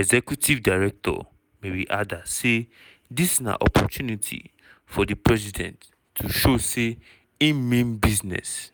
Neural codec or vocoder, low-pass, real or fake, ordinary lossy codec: none; none; real; none